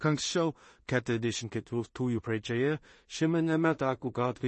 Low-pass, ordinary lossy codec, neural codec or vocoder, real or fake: 10.8 kHz; MP3, 32 kbps; codec, 16 kHz in and 24 kHz out, 0.4 kbps, LongCat-Audio-Codec, two codebook decoder; fake